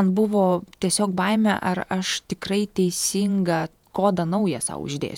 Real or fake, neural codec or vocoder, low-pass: fake; vocoder, 48 kHz, 128 mel bands, Vocos; 19.8 kHz